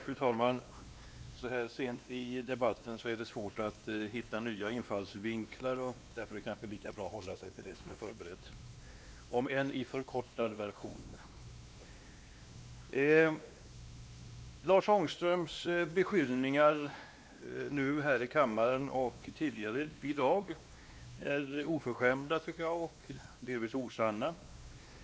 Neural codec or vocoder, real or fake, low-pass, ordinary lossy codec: codec, 16 kHz, 2 kbps, X-Codec, WavLM features, trained on Multilingual LibriSpeech; fake; none; none